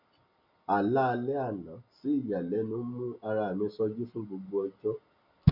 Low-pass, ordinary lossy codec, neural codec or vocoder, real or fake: 5.4 kHz; none; none; real